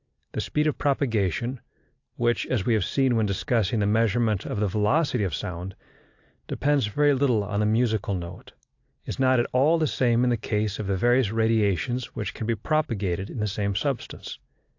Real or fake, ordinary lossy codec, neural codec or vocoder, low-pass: real; AAC, 48 kbps; none; 7.2 kHz